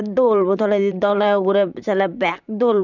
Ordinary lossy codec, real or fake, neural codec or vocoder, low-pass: none; fake; vocoder, 44.1 kHz, 128 mel bands, Pupu-Vocoder; 7.2 kHz